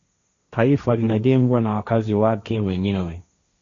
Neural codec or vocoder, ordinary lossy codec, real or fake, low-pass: codec, 16 kHz, 1.1 kbps, Voila-Tokenizer; Opus, 64 kbps; fake; 7.2 kHz